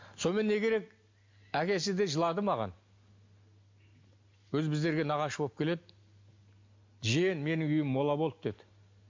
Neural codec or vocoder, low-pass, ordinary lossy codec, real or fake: none; 7.2 kHz; MP3, 48 kbps; real